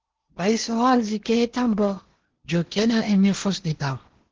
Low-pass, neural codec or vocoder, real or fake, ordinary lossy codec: 7.2 kHz; codec, 16 kHz in and 24 kHz out, 0.8 kbps, FocalCodec, streaming, 65536 codes; fake; Opus, 16 kbps